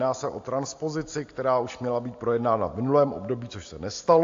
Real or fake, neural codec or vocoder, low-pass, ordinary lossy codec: real; none; 7.2 kHz; AAC, 48 kbps